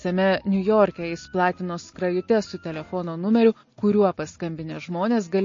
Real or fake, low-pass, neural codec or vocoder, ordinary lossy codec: real; 7.2 kHz; none; MP3, 32 kbps